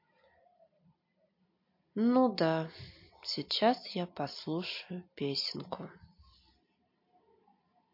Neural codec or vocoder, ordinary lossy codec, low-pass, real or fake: none; MP3, 32 kbps; 5.4 kHz; real